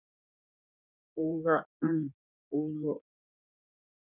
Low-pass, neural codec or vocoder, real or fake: 3.6 kHz; codec, 16 kHz in and 24 kHz out, 1.1 kbps, FireRedTTS-2 codec; fake